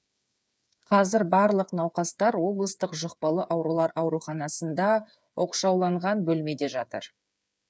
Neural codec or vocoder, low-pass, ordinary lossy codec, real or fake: codec, 16 kHz, 8 kbps, FreqCodec, smaller model; none; none; fake